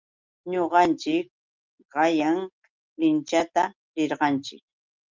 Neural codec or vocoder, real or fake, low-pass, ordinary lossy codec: none; real; 7.2 kHz; Opus, 24 kbps